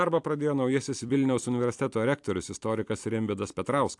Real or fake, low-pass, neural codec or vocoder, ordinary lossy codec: fake; 10.8 kHz; vocoder, 44.1 kHz, 128 mel bands every 256 samples, BigVGAN v2; AAC, 64 kbps